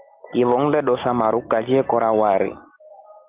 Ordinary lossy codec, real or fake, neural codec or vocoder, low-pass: Opus, 32 kbps; real; none; 3.6 kHz